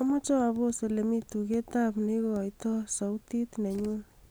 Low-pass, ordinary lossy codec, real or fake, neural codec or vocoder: none; none; real; none